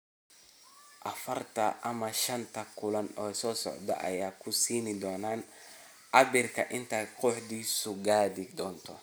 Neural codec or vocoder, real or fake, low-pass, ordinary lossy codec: none; real; none; none